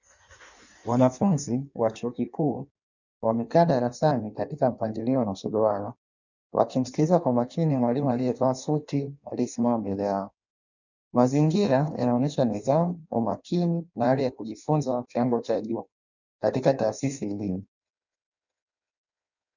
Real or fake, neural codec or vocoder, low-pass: fake; codec, 16 kHz in and 24 kHz out, 1.1 kbps, FireRedTTS-2 codec; 7.2 kHz